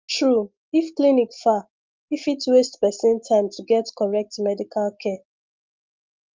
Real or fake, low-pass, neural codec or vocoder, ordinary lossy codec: real; 7.2 kHz; none; Opus, 32 kbps